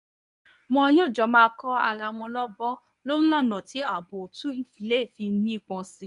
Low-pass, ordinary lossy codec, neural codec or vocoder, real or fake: 10.8 kHz; none; codec, 24 kHz, 0.9 kbps, WavTokenizer, medium speech release version 1; fake